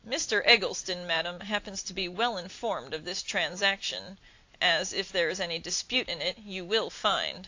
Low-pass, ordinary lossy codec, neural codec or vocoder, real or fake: 7.2 kHz; AAC, 48 kbps; none; real